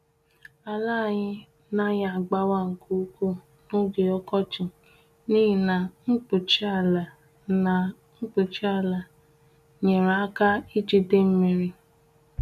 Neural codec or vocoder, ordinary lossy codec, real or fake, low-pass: none; none; real; 14.4 kHz